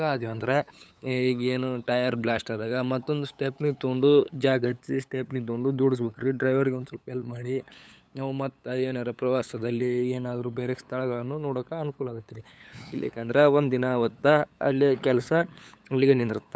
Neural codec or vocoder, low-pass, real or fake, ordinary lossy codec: codec, 16 kHz, 8 kbps, FunCodec, trained on LibriTTS, 25 frames a second; none; fake; none